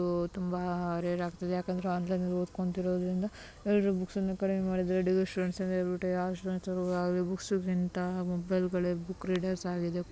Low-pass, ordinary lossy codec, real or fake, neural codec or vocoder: none; none; real; none